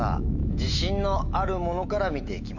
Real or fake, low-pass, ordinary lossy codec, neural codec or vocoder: fake; 7.2 kHz; none; autoencoder, 48 kHz, 128 numbers a frame, DAC-VAE, trained on Japanese speech